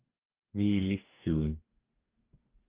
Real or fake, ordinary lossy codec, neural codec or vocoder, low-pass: fake; Opus, 24 kbps; codec, 44.1 kHz, 2.6 kbps, DAC; 3.6 kHz